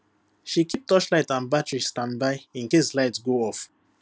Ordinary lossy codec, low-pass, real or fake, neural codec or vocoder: none; none; real; none